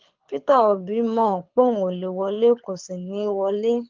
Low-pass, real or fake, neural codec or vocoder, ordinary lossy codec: 7.2 kHz; fake; codec, 24 kHz, 6 kbps, HILCodec; Opus, 32 kbps